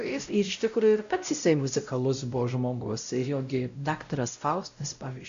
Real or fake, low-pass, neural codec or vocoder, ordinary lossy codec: fake; 7.2 kHz; codec, 16 kHz, 0.5 kbps, X-Codec, WavLM features, trained on Multilingual LibriSpeech; AAC, 64 kbps